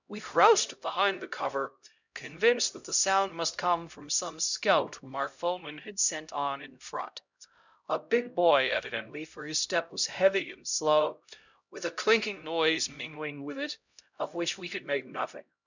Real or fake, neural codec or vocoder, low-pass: fake; codec, 16 kHz, 0.5 kbps, X-Codec, HuBERT features, trained on LibriSpeech; 7.2 kHz